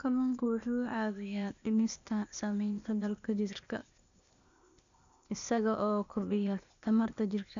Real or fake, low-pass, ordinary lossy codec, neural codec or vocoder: fake; 7.2 kHz; none; codec, 16 kHz, 0.8 kbps, ZipCodec